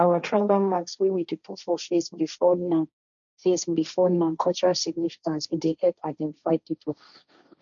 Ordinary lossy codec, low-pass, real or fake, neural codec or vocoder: none; 7.2 kHz; fake; codec, 16 kHz, 1.1 kbps, Voila-Tokenizer